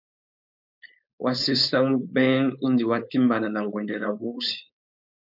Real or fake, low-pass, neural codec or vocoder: fake; 5.4 kHz; codec, 16 kHz, 4.8 kbps, FACodec